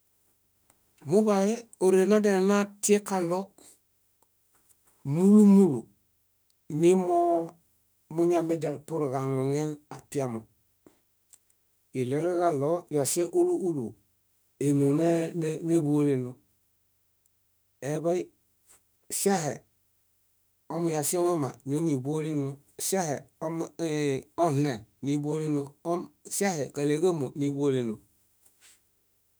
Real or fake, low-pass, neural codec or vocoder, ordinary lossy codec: fake; none; autoencoder, 48 kHz, 32 numbers a frame, DAC-VAE, trained on Japanese speech; none